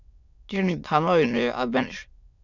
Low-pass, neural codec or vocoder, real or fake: 7.2 kHz; autoencoder, 22.05 kHz, a latent of 192 numbers a frame, VITS, trained on many speakers; fake